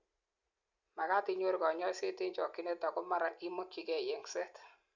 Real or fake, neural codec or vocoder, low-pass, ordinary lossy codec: real; none; 7.2 kHz; Opus, 64 kbps